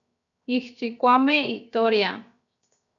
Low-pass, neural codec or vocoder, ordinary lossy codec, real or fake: 7.2 kHz; codec, 16 kHz, 0.7 kbps, FocalCodec; MP3, 96 kbps; fake